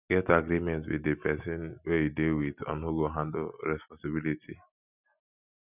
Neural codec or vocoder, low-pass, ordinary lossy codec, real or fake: none; 3.6 kHz; none; real